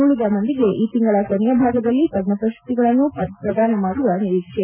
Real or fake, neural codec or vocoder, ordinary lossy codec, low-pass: real; none; none; 3.6 kHz